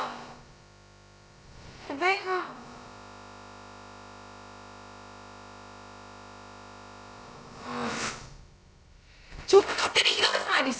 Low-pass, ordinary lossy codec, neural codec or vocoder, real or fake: none; none; codec, 16 kHz, about 1 kbps, DyCAST, with the encoder's durations; fake